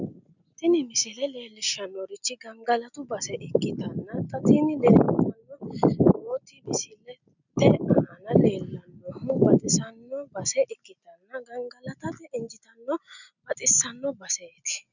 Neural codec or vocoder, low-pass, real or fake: none; 7.2 kHz; real